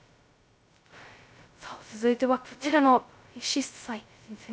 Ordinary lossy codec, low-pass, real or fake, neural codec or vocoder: none; none; fake; codec, 16 kHz, 0.2 kbps, FocalCodec